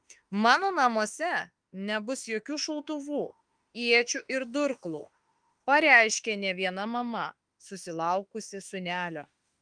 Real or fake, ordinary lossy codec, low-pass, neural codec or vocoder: fake; Opus, 32 kbps; 9.9 kHz; autoencoder, 48 kHz, 32 numbers a frame, DAC-VAE, trained on Japanese speech